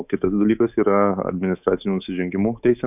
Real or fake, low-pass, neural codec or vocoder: fake; 3.6 kHz; codec, 24 kHz, 3.1 kbps, DualCodec